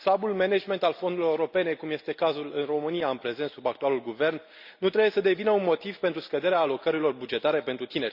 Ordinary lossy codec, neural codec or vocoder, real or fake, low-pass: Opus, 64 kbps; none; real; 5.4 kHz